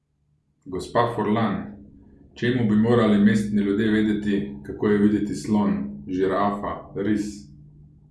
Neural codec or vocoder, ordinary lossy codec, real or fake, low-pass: none; none; real; none